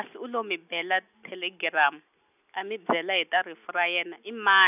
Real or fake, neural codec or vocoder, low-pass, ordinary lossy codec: real; none; 3.6 kHz; none